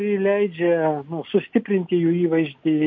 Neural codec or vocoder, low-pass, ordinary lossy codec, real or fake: none; 7.2 kHz; MP3, 48 kbps; real